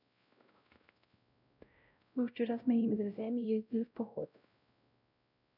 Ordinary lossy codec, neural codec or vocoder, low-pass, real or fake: none; codec, 16 kHz, 0.5 kbps, X-Codec, WavLM features, trained on Multilingual LibriSpeech; 5.4 kHz; fake